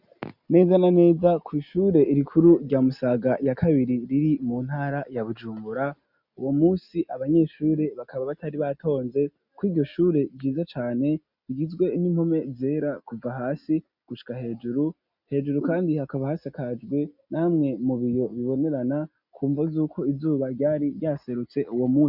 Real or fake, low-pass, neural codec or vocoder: real; 5.4 kHz; none